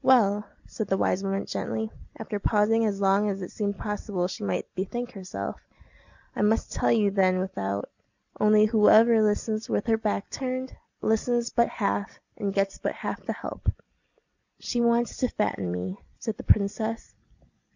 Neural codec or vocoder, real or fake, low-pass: none; real; 7.2 kHz